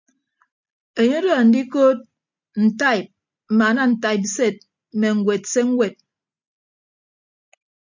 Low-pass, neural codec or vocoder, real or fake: 7.2 kHz; none; real